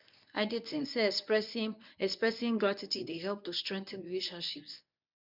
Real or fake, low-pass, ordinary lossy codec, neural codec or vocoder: fake; 5.4 kHz; none; codec, 24 kHz, 0.9 kbps, WavTokenizer, medium speech release version 1